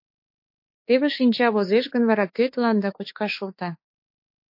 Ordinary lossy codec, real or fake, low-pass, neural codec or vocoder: MP3, 32 kbps; fake; 5.4 kHz; autoencoder, 48 kHz, 32 numbers a frame, DAC-VAE, trained on Japanese speech